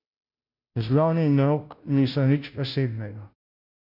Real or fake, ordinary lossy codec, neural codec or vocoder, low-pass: fake; none; codec, 16 kHz, 0.5 kbps, FunCodec, trained on Chinese and English, 25 frames a second; 5.4 kHz